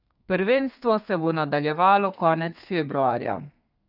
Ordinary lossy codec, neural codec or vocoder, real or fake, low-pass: none; codec, 32 kHz, 1.9 kbps, SNAC; fake; 5.4 kHz